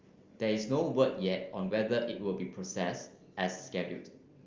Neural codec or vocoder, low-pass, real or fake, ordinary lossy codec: none; 7.2 kHz; real; Opus, 32 kbps